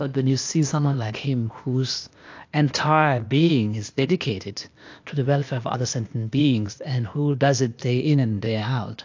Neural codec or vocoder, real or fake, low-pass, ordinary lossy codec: codec, 16 kHz, 0.8 kbps, ZipCodec; fake; 7.2 kHz; AAC, 48 kbps